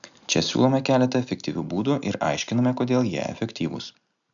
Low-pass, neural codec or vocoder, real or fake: 7.2 kHz; none; real